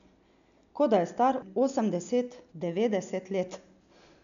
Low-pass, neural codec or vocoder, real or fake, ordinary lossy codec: 7.2 kHz; none; real; none